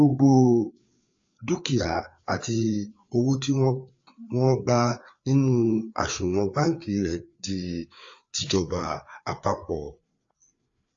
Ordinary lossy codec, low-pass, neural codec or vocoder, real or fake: AAC, 48 kbps; 7.2 kHz; codec, 16 kHz, 4 kbps, FreqCodec, larger model; fake